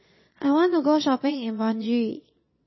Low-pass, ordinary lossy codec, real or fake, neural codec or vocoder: 7.2 kHz; MP3, 24 kbps; fake; vocoder, 22.05 kHz, 80 mel bands, Vocos